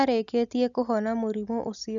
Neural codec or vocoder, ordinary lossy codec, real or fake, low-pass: none; none; real; 7.2 kHz